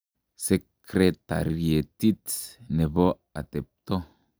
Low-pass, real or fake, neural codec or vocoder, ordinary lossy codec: none; real; none; none